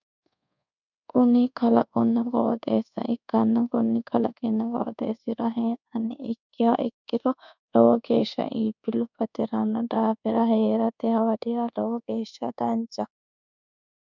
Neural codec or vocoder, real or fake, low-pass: codec, 24 kHz, 1.2 kbps, DualCodec; fake; 7.2 kHz